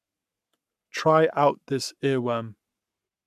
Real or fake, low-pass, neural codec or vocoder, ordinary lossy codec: fake; 14.4 kHz; codec, 44.1 kHz, 7.8 kbps, Pupu-Codec; none